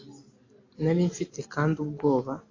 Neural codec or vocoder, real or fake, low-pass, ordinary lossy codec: none; real; 7.2 kHz; AAC, 32 kbps